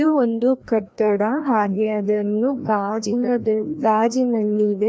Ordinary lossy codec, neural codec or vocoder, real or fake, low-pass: none; codec, 16 kHz, 1 kbps, FreqCodec, larger model; fake; none